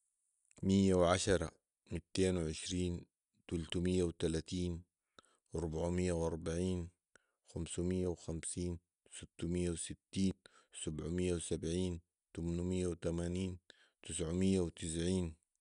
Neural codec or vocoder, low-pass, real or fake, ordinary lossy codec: none; 10.8 kHz; real; none